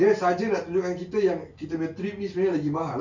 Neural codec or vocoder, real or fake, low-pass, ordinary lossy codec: none; real; 7.2 kHz; none